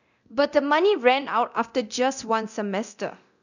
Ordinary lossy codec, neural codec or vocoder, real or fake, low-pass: none; codec, 24 kHz, 0.9 kbps, DualCodec; fake; 7.2 kHz